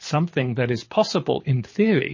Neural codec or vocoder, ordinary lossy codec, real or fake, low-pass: codec, 24 kHz, 6 kbps, HILCodec; MP3, 32 kbps; fake; 7.2 kHz